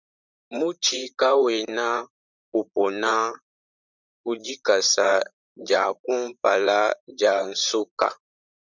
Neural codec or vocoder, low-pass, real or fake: vocoder, 44.1 kHz, 128 mel bands, Pupu-Vocoder; 7.2 kHz; fake